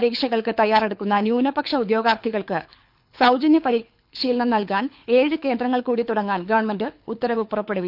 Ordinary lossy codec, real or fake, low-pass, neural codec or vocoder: none; fake; 5.4 kHz; codec, 24 kHz, 6 kbps, HILCodec